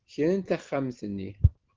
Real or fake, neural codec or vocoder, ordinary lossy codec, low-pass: real; none; Opus, 16 kbps; 7.2 kHz